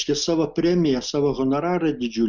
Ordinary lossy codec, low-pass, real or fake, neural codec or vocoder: Opus, 64 kbps; 7.2 kHz; real; none